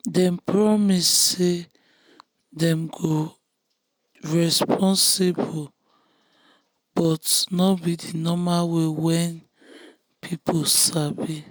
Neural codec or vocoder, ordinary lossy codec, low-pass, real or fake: none; none; none; real